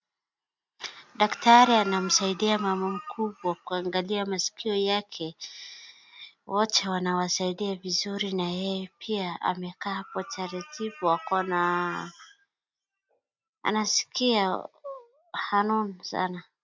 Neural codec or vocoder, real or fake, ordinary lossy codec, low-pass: none; real; MP3, 64 kbps; 7.2 kHz